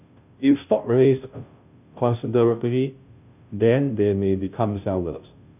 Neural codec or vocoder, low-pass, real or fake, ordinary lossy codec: codec, 16 kHz, 0.5 kbps, FunCodec, trained on Chinese and English, 25 frames a second; 3.6 kHz; fake; none